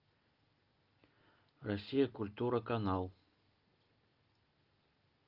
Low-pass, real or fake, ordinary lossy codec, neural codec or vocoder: 5.4 kHz; real; Opus, 32 kbps; none